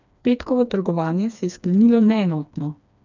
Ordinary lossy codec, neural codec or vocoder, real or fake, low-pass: none; codec, 16 kHz, 2 kbps, FreqCodec, smaller model; fake; 7.2 kHz